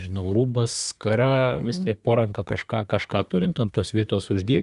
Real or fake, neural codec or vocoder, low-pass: fake; codec, 24 kHz, 1 kbps, SNAC; 10.8 kHz